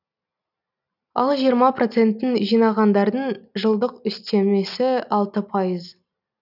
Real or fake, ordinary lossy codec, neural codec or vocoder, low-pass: real; none; none; 5.4 kHz